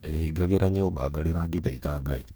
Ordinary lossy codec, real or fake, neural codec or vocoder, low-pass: none; fake; codec, 44.1 kHz, 2.6 kbps, DAC; none